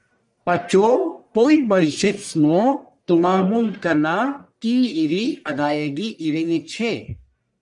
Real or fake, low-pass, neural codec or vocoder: fake; 10.8 kHz; codec, 44.1 kHz, 1.7 kbps, Pupu-Codec